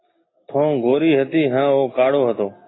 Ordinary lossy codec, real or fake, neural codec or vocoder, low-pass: AAC, 16 kbps; real; none; 7.2 kHz